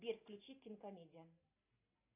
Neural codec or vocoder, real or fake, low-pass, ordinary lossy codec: none; real; 3.6 kHz; AAC, 32 kbps